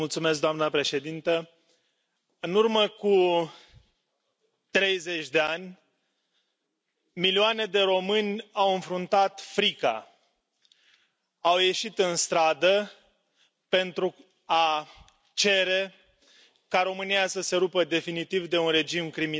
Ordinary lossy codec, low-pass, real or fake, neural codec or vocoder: none; none; real; none